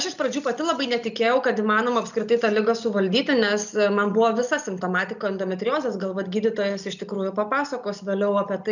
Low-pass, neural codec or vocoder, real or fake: 7.2 kHz; none; real